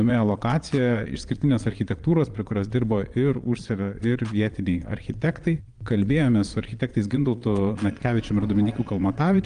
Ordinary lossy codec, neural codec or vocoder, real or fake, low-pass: Opus, 32 kbps; vocoder, 22.05 kHz, 80 mel bands, Vocos; fake; 9.9 kHz